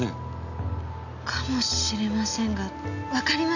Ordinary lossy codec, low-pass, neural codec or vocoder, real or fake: none; 7.2 kHz; none; real